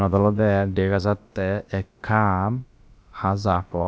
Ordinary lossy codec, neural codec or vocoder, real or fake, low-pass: none; codec, 16 kHz, about 1 kbps, DyCAST, with the encoder's durations; fake; none